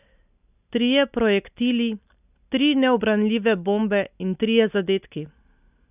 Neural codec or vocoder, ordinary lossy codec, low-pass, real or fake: none; none; 3.6 kHz; real